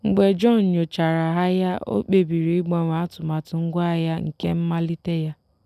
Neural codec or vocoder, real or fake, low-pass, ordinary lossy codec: none; real; 14.4 kHz; none